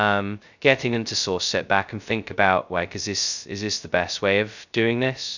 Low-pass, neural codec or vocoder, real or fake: 7.2 kHz; codec, 16 kHz, 0.2 kbps, FocalCodec; fake